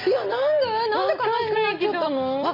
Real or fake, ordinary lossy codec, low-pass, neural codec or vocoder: real; none; 5.4 kHz; none